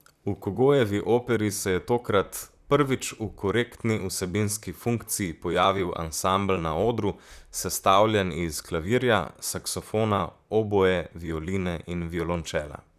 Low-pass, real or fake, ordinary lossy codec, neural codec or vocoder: 14.4 kHz; fake; none; vocoder, 44.1 kHz, 128 mel bands, Pupu-Vocoder